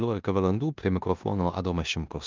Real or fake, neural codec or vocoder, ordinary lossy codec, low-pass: fake; codec, 16 kHz in and 24 kHz out, 0.9 kbps, LongCat-Audio-Codec, four codebook decoder; Opus, 24 kbps; 7.2 kHz